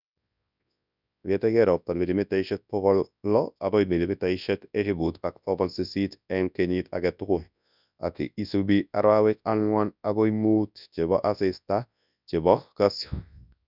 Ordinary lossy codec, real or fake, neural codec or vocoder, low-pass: none; fake; codec, 24 kHz, 0.9 kbps, WavTokenizer, large speech release; 5.4 kHz